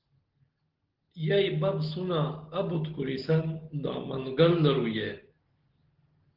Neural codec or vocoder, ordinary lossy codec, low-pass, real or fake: none; Opus, 16 kbps; 5.4 kHz; real